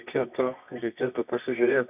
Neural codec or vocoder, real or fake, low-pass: codec, 24 kHz, 0.9 kbps, WavTokenizer, medium music audio release; fake; 3.6 kHz